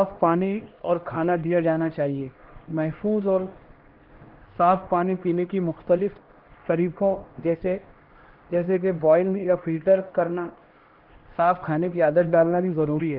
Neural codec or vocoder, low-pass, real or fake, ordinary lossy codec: codec, 16 kHz, 1 kbps, X-Codec, HuBERT features, trained on LibriSpeech; 5.4 kHz; fake; Opus, 16 kbps